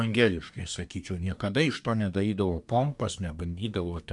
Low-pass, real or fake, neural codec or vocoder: 10.8 kHz; fake; codec, 24 kHz, 1 kbps, SNAC